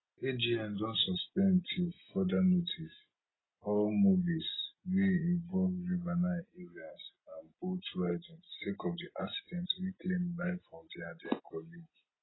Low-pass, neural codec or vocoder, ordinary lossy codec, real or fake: 7.2 kHz; none; AAC, 16 kbps; real